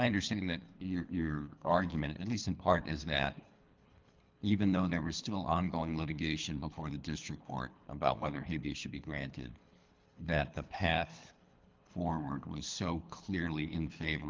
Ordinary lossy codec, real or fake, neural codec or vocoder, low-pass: Opus, 24 kbps; fake; codec, 24 kHz, 3 kbps, HILCodec; 7.2 kHz